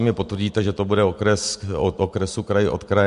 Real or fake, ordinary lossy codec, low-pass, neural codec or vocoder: real; MP3, 64 kbps; 10.8 kHz; none